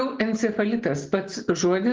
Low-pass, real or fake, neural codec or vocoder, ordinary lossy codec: 7.2 kHz; real; none; Opus, 16 kbps